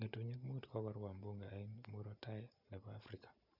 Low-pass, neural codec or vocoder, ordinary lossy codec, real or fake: 5.4 kHz; none; none; real